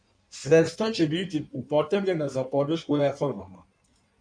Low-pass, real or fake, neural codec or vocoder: 9.9 kHz; fake; codec, 16 kHz in and 24 kHz out, 1.1 kbps, FireRedTTS-2 codec